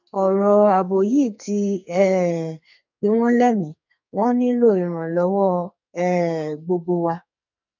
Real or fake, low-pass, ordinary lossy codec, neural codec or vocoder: fake; 7.2 kHz; none; codec, 44.1 kHz, 2.6 kbps, SNAC